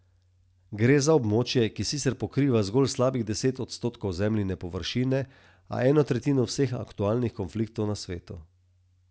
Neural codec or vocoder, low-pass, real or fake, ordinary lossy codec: none; none; real; none